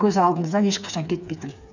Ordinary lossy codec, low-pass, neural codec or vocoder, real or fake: none; 7.2 kHz; codec, 16 kHz, 4 kbps, FreqCodec, smaller model; fake